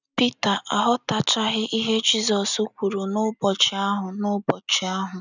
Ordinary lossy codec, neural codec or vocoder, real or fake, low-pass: none; none; real; 7.2 kHz